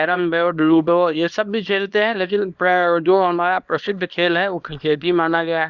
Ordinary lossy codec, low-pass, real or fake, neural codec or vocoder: Opus, 64 kbps; 7.2 kHz; fake; codec, 24 kHz, 0.9 kbps, WavTokenizer, small release